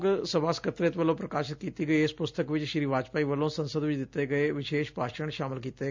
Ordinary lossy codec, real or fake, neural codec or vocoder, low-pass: MP3, 64 kbps; real; none; 7.2 kHz